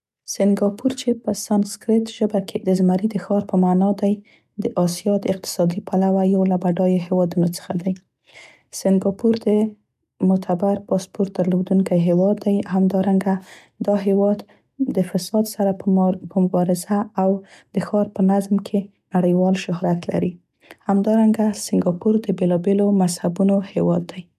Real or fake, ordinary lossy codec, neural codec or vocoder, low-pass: real; none; none; 14.4 kHz